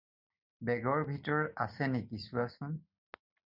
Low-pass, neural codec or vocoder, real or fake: 5.4 kHz; vocoder, 44.1 kHz, 128 mel bands every 256 samples, BigVGAN v2; fake